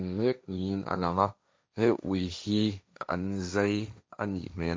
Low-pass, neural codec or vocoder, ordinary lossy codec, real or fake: none; codec, 16 kHz, 1.1 kbps, Voila-Tokenizer; none; fake